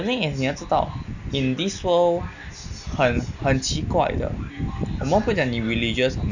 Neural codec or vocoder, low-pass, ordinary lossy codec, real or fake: none; 7.2 kHz; none; real